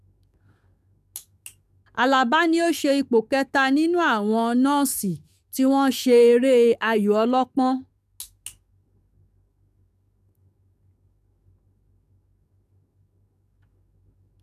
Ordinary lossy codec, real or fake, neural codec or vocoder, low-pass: none; fake; codec, 44.1 kHz, 7.8 kbps, DAC; 14.4 kHz